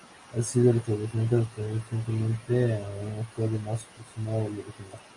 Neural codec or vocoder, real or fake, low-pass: none; real; 10.8 kHz